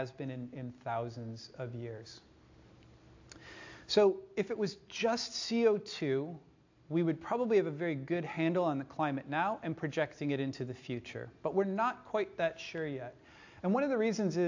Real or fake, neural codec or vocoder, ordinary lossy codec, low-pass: real; none; AAC, 48 kbps; 7.2 kHz